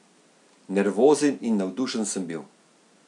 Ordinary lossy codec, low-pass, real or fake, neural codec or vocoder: none; 10.8 kHz; real; none